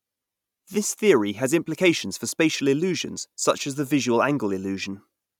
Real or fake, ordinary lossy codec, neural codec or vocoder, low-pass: real; none; none; 19.8 kHz